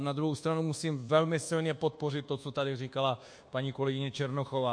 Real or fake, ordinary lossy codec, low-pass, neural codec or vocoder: fake; MP3, 48 kbps; 9.9 kHz; codec, 24 kHz, 1.2 kbps, DualCodec